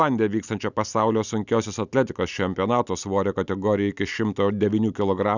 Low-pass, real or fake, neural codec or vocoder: 7.2 kHz; real; none